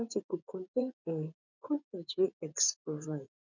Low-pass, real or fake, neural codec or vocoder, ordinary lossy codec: 7.2 kHz; fake; vocoder, 24 kHz, 100 mel bands, Vocos; none